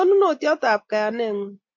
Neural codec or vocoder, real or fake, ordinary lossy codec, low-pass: none; real; MP3, 48 kbps; 7.2 kHz